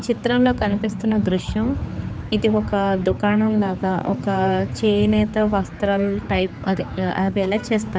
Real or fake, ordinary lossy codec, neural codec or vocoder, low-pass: fake; none; codec, 16 kHz, 4 kbps, X-Codec, HuBERT features, trained on general audio; none